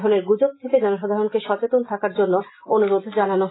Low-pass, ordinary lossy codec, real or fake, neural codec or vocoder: 7.2 kHz; AAC, 16 kbps; real; none